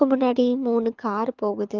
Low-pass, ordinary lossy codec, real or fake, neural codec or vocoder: 7.2 kHz; Opus, 32 kbps; fake; codec, 24 kHz, 6 kbps, HILCodec